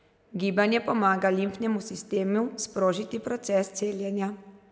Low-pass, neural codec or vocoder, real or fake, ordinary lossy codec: none; none; real; none